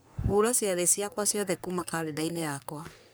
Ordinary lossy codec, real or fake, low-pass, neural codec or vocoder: none; fake; none; codec, 44.1 kHz, 3.4 kbps, Pupu-Codec